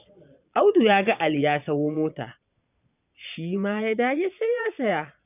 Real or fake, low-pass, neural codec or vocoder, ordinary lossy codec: fake; 3.6 kHz; vocoder, 22.05 kHz, 80 mel bands, Vocos; none